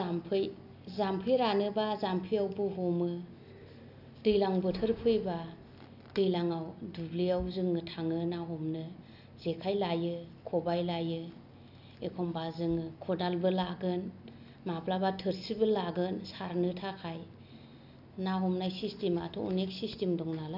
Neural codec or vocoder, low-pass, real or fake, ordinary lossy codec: none; 5.4 kHz; real; none